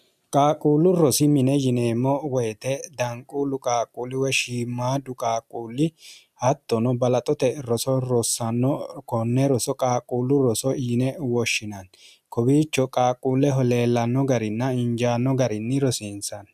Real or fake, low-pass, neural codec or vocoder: real; 14.4 kHz; none